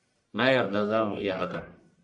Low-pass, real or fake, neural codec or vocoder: 10.8 kHz; fake; codec, 44.1 kHz, 1.7 kbps, Pupu-Codec